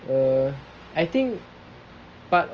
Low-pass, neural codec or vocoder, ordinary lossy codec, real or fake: 7.2 kHz; none; Opus, 24 kbps; real